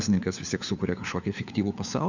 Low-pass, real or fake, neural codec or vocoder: 7.2 kHz; fake; codec, 16 kHz, 4 kbps, FunCodec, trained on LibriTTS, 50 frames a second